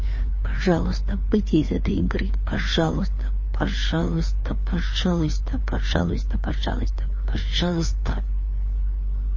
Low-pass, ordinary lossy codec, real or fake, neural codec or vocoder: 7.2 kHz; MP3, 32 kbps; fake; codec, 16 kHz, 4 kbps, FreqCodec, larger model